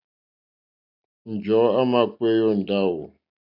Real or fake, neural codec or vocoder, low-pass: real; none; 5.4 kHz